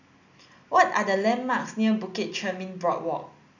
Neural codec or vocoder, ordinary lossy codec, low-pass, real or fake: none; none; 7.2 kHz; real